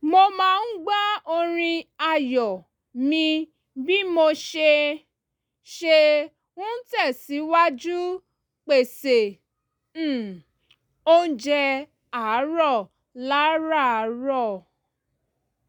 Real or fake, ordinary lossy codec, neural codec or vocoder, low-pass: real; none; none; 19.8 kHz